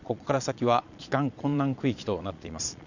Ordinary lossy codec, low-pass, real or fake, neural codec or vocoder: none; 7.2 kHz; fake; vocoder, 22.05 kHz, 80 mel bands, Vocos